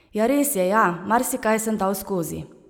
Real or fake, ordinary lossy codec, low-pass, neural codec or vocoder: real; none; none; none